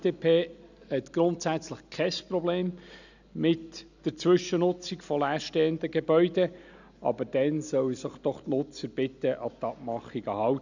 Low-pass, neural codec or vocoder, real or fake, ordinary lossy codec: 7.2 kHz; none; real; none